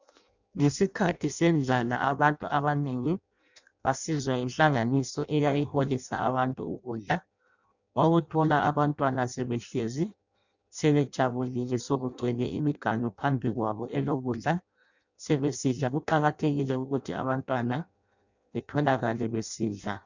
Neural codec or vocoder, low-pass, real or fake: codec, 16 kHz in and 24 kHz out, 0.6 kbps, FireRedTTS-2 codec; 7.2 kHz; fake